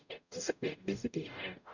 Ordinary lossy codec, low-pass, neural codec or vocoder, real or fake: none; 7.2 kHz; codec, 44.1 kHz, 0.9 kbps, DAC; fake